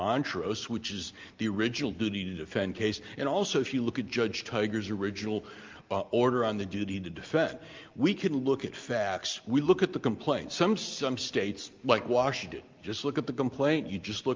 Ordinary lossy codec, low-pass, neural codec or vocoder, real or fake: Opus, 24 kbps; 7.2 kHz; none; real